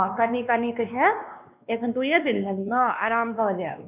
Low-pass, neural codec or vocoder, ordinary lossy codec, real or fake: 3.6 kHz; codec, 16 kHz, 2 kbps, X-Codec, WavLM features, trained on Multilingual LibriSpeech; none; fake